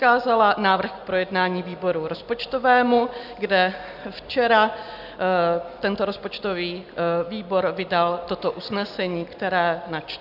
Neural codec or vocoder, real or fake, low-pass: none; real; 5.4 kHz